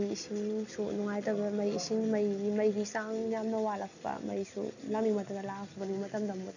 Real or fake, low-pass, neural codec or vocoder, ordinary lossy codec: real; 7.2 kHz; none; none